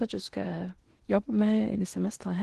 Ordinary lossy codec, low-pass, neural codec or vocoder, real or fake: Opus, 16 kbps; 10.8 kHz; codec, 16 kHz in and 24 kHz out, 0.8 kbps, FocalCodec, streaming, 65536 codes; fake